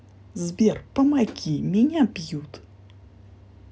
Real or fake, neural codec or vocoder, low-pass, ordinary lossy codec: real; none; none; none